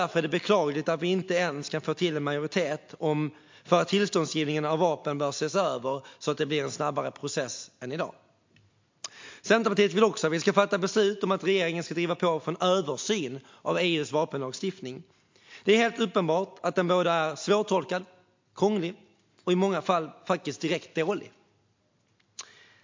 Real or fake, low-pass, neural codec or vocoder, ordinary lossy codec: real; 7.2 kHz; none; MP3, 48 kbps